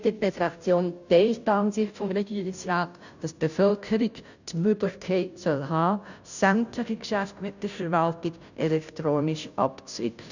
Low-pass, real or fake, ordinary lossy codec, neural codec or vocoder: 7.2 kHz; fake; none; codec, 16 kHz, 0.5 kbps, FunCodec, trained on Chinese and English, 25 frames a second